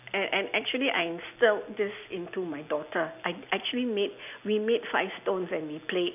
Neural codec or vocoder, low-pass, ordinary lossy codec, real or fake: none; 3.6 kHz; none; real